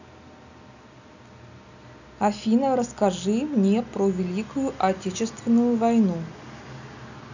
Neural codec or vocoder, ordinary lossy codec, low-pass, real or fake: none; none; 7.2 kHz; real